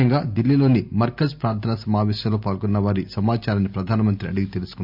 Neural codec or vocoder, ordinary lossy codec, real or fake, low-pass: none; MP3, 48 kbps; real; 5.4 kHz